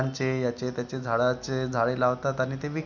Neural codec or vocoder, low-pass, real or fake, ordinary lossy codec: none; 7.2 kHz; real; none